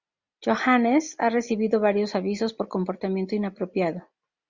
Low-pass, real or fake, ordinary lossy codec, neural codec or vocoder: 7.2 kHz; real; Opus, 64 kbps; none